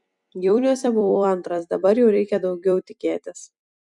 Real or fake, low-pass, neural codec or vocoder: fake; 10.8 kHz; vocoder, 44.1 kHz, 128 mel bands every 256 samples, BigVGAN v2